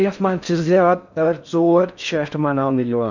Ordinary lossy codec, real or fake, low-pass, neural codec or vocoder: none; fake; 7.2 kHz; codec, 16 kHz in and 24 kHz out, 0.6 kbps, FocalCodec, streaming, 4096 codes